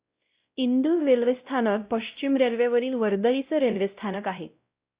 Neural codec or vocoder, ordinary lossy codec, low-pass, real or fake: codec, 16 kHz, 0.5 kbps, X-Codec, WavLM features, trained on Multilingual LibriSpeech; Opus, 64 kbps; 3.6 kHz; fake